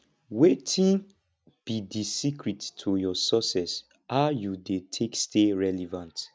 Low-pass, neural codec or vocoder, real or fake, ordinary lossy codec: none; none; real; none